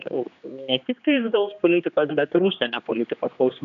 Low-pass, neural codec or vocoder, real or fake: 7.2 kHz; codec, 16 kHz, 2 kbps, X-Codec, HuBERT features, trained on general audio; fake